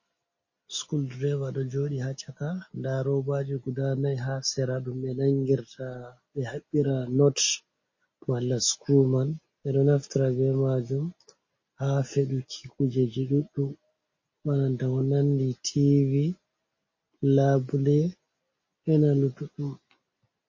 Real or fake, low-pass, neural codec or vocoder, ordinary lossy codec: real; 7.2 kHz; none; MP3, 32 kbps